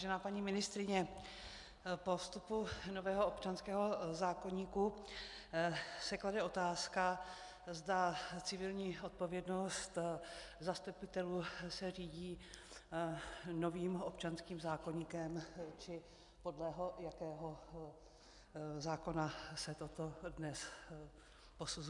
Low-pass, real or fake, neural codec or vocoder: 10.8 kHz; real; none